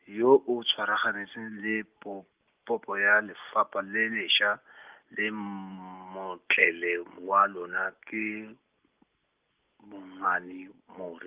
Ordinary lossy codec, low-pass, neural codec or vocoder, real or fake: Opus, 24 kbps; 3.6 kHz; none; real